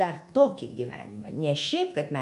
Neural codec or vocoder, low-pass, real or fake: codec, 24 kHz, 1.2 kbps, DualCodec; 10.8 kHz; fake